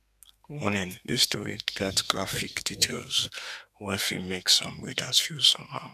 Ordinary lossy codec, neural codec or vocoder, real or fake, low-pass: none; codec, 32 kHz, 1.9 kbps, SNAC; fake; 14.4 kHz